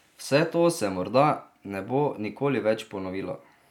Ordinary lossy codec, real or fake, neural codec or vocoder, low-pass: none; real; none; 19.8 kHz